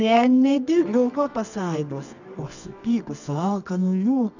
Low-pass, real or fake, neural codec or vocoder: 7.2 kHz; fake; codec, 24 kHz, 0.9 kbps, WavTokenizer, medium music audio release